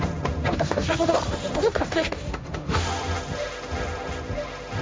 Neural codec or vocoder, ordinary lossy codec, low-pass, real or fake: codec, 16 kHz, 1.1 kbps, Voila-Tokenizer; none; none; fake